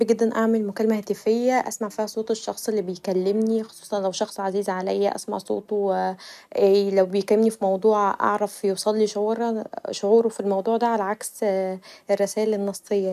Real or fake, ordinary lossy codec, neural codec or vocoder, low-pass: real; none; none; 14.4 kHz